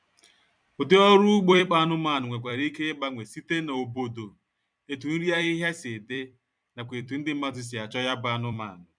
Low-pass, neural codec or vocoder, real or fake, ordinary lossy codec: 9.9 kHz; vocoder, 44.1 kHz, 128 mel bands every 256 samples, BigVGAN v2; fake; none